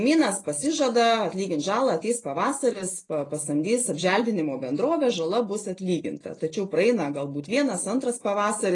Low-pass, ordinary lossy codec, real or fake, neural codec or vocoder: 10.8 kHz; AAC, 32 kbps; real; none